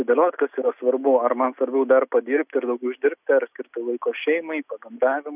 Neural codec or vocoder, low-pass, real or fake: none; 3.6 kHz; real